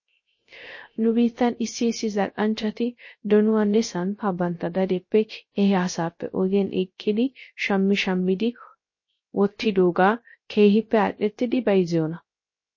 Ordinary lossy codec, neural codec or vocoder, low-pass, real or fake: MP3, 32 kbps; codec, 16 kHz, 0.3 kbps, FocalCodec; 7.2 kHz; fake